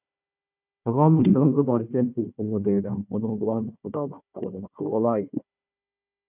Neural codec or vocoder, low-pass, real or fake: codec, 16 kHz, 1 kbps, FunCodec, trained on Chinese and English, 50 frames a second; 3.6 kHz; fake